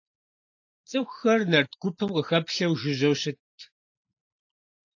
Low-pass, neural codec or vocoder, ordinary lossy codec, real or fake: 7.2 kHz; none; AAC, 48 kbps; real